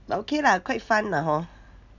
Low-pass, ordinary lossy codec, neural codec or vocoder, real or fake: 7.2 kHz; none; none; real